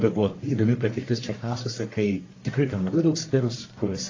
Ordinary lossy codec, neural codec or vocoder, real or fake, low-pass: AAC, 32 kbps; codec, 44.1 kHz, 1.7 kbps, Pupu-Codec; fake; 7.2 kHz